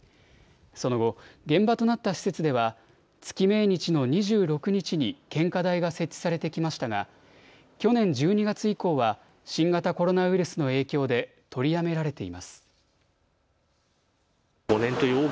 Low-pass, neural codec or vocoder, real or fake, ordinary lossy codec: none; none; real; none